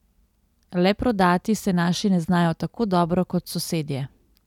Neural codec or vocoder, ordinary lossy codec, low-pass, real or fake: none; none; 19.8 kHz; real